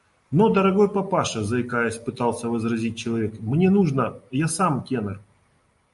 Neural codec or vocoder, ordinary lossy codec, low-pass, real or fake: none; MP3, 48 kbps; 14.4 kHz; real